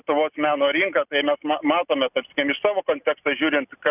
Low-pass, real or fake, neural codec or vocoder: 3.6 kHz; real; none